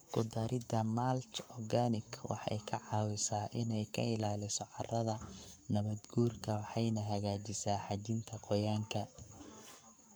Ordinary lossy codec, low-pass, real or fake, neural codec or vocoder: none; none; fake; codec, 44.1 kHz, 7.8 kbps, Pupu-Codec